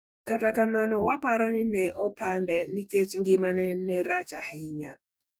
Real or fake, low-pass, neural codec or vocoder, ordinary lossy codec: fake; none; codec, 44.1 kHz, 2.6 kbps, DAC; none